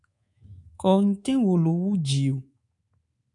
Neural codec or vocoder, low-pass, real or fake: codec, 24 kHz, 3.1 kbps, DualCodec; 10.8 kHz; fake